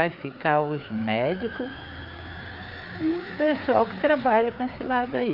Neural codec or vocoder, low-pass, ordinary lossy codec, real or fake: codec, 16 kHz, 4 kbps, FreqCodec, larger model; 5.4 kHz; none; fake